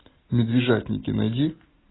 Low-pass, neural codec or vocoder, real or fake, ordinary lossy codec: 7.2 kHz; none; real; AAC, 16 kbps